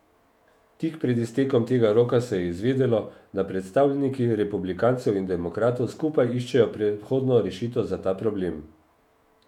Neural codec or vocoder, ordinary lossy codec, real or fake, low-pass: autoencoder, 48 kHz, 128 numbers a frame, DAC-VAE, trained on Japanese speech; MP3, 96 kbps; fake; 19.8 kHz